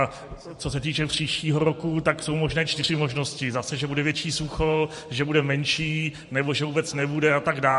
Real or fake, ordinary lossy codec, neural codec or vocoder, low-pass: fake; MP3, 48 kbps; vocoder, 44.1 kHz, 128 mel bands every 512 samples, BigVGAN v2; 14.4 kHz